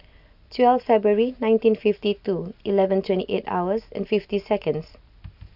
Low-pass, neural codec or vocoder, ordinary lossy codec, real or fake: 5.4 kHz; none; none; real